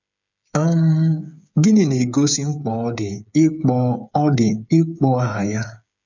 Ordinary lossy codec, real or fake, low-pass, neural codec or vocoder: none; fake; 7.2 kHz; codec, 16 kHz, 8 kbps, FreqCodec, smaller model